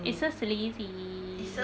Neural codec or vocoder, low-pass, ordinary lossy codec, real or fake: none; none; none; real